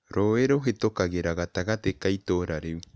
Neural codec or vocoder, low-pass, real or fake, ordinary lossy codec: none; none; real; none